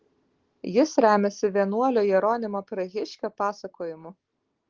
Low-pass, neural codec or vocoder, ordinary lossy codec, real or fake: 7.2 kHz; none; Opus, 16 kbps; real